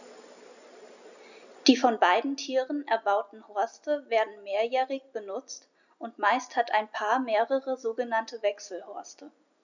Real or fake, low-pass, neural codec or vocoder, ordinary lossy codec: real; 7.2 kHz; none; none